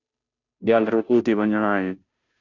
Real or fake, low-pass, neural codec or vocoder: fake; 7.2 kHz; codec, 16 kHz, 0.5 kbps, FunCodec, trained on Chinese and English, 25 frames a second